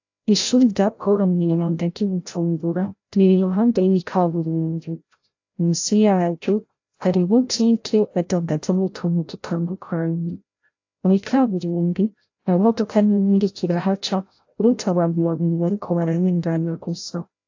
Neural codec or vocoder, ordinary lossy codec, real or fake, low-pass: codec, 16 kHz, 0.5 kbps, FreqCodec, larger model; AAC, 48 kbps; fake; 7.2 kHz